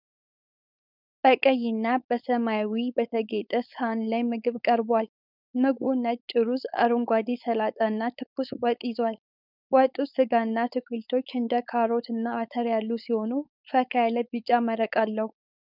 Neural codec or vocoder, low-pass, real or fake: codec, 16 kHz, 4.8 kbps, FACodec; 5.4 kHz; fake